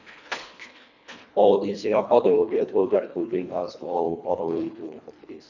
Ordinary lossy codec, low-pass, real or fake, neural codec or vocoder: none; 7.2 kHz; fake; codec, 24 kHz, 1.5 kbps, HILCodec